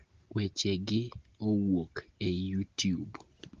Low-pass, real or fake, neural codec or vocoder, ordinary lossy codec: 7.2 kHz; real; none; Opus, 16 kbps